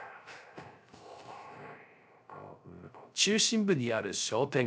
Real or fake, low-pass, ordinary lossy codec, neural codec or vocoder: fake; none; none; codec, 16 kHz, 0.3 kbps, FocalCodec